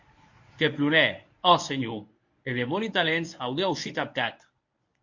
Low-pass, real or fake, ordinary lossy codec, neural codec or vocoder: 7.2 kHz; fake; MP3, 48 kbps; codec, 24 kHz, 0.9 kbps, WavTokenizer, medium speech release version 1